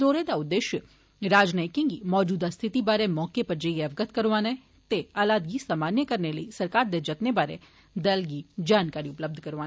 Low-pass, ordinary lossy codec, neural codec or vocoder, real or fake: none; none; none; real